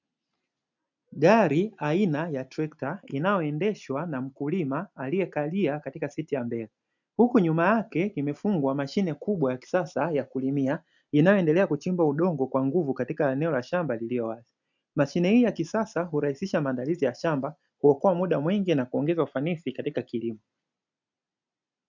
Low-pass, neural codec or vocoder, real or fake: 7.2 kHz; none; real